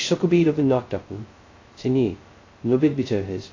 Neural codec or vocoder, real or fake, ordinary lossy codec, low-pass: codec, 16 kHz, 0.2 kbps, FocalCodec; fake; AAC, 32 kbps; 7.2 kHz